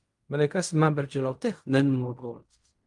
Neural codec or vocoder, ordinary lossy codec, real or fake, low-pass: codec, 16 kHz in and 24 kHz out, 0.4 kbps, LongCat-Audio-Codec, fine tuned four codebook decoder; Opus, 32 kbps; fake; 10.8 kHz